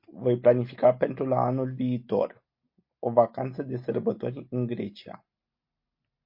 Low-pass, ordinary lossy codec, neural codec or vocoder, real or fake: 5.4 kHz; MP3, 32 kbps; none; real